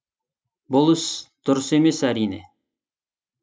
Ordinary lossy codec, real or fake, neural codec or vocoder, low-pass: none; real; none; none